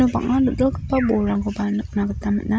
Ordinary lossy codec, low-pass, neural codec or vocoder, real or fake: none; none; none; real